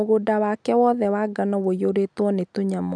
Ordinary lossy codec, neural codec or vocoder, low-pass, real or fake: none; none; 9.9 kHz; real